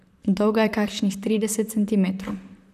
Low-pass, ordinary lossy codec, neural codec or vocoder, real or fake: 14.4 kHz; none; vocoder, 44.1 kHz, 128 mel bands, Pupu-Vocoder; fake